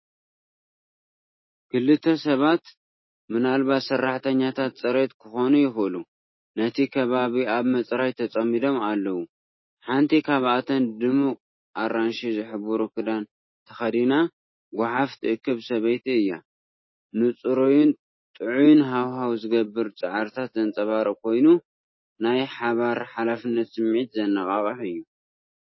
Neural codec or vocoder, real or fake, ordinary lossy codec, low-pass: none; real; MP3, 24 kbps; 7.2 kHz